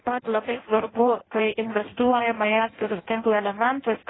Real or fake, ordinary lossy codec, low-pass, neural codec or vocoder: fake; AAC, 16 kbps; 7.2 kHz; codec, 16 kHz in and 24 kHz out, 0.6 kbps, FireRedTTS-2 codec